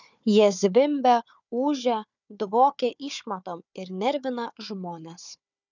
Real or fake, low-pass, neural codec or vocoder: fake; 7.2 kHz; codec, 16 kHz, 4 kbps, FunCodec, trained on Chinese and English, 50 frames a second